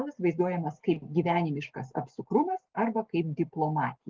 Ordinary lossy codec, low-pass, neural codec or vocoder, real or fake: Opus, 16 kbps; 7.2 kHz; none; real